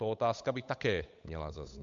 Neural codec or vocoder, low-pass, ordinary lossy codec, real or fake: codec, 16 kHz, 8 kbps, FunCodec, trained on Chinese and English, 25 frames a second; 7.2 kHz; MP3, 64 kbps; fake